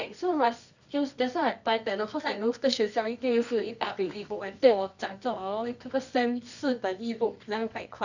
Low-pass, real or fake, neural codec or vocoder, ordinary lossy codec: 7.2 kHz; fake; codec, 24 kHz, 0.9 kbps, WavTokenizer, medium music audio release; none